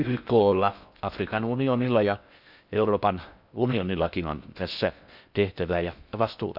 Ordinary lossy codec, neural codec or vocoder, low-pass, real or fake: none; codec, 16 kHz in and 24 kHz out, 0.6 kbps, FocalCodec, streaming, 4096 codes; 5.4 kHz; fake